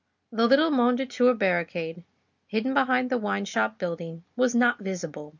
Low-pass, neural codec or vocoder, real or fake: 7.2 kHz; none; real